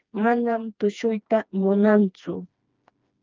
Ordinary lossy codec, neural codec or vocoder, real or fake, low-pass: Opus, 32 kbps; codec, 16 kHz, 2 kbps, FreqCodec, smaller model; fake; 7.2 kHz